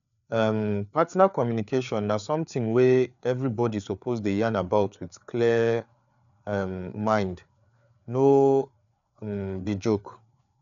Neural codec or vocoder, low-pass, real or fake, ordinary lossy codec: codec, 16 kHz, 4 kbps, FreqCodec, larger model; 7.2 kHz; fake; none